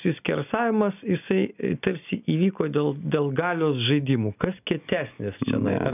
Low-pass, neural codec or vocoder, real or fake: 3.6 kHz; none; real